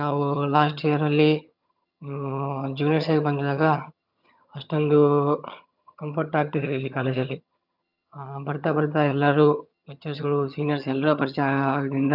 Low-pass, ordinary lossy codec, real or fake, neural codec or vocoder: 5.4 kHz; none; fake; vocoder, 22.05 kHz, 80 mel bands, HiFi-GAN